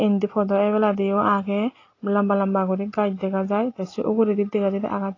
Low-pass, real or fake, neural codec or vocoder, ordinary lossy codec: 7.2 kHz; real; none; AAC, 32 kbps